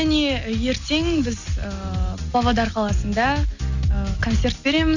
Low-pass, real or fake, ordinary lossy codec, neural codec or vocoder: 7.2 kHz; real; none; none